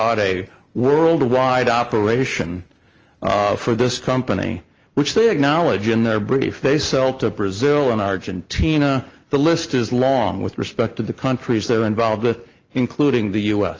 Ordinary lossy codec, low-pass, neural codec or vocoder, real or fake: Opus, 24 kbps; 7.2 kHz; none; real